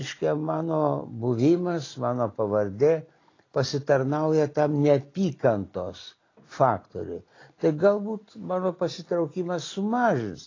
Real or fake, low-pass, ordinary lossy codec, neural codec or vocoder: real; 7.2 kHz; AAC, 32 kbps; none